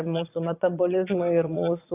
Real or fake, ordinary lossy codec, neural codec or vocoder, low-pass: fake; AAC, 24 kbps; vocoder, 22.05 kHz, 80 mel bands, Vocos; 3.6 kHz